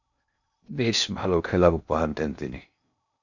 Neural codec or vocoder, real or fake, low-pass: codec, 16 kHz in and 24 kHz out, 0.6 kbps, FocalCodec, streaming, 2048 codes; fake; 7.2 kHz